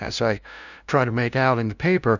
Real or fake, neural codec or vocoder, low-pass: fake; codec, 16 kHz, 0.5 kbps, FunCodec, trained on LibriTTS, 25 frames a second; 7.2 kHz